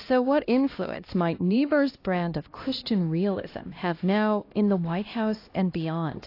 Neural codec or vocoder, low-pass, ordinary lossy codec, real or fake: codec, 16 kHz, 1 kbps, X-Codec, HuBERT features, trained on LibriSpeech; 5.4 kHz; AAC, 32 kbps; fake